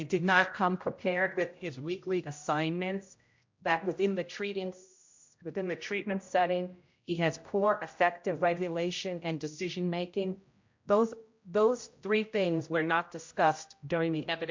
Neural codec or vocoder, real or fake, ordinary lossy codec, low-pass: codec, 16 kHz, 0.5 kbps, X-Codec, HuBERT features, trained on general audio; fake; MP3, 48 kbps; 7.2 kHz